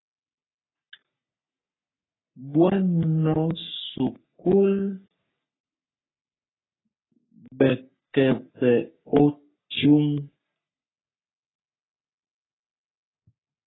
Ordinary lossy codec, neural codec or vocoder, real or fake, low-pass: AAC, 16 kbps; codec, 44.1 kHz, 3.4 kbps, Pupu-Codec; fake; 7.2 kHz